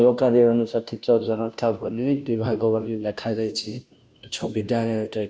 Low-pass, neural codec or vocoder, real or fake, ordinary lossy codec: none; codec, 16 kHz, 0.5 kbps, FunCodec, trained on Chinese and English, 25 frames a second; fake; none